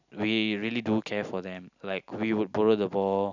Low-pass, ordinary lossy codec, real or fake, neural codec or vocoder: 7.2 kHz; none; real; none